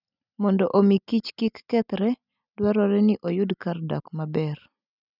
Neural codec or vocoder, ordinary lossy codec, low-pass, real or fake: none; none; 5.4 kHz; real